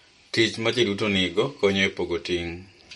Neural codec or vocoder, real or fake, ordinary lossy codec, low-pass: none; real; MP3, 48 kbps; 19.8 kHz